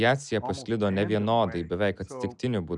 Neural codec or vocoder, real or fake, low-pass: none; real; 10.8 kHz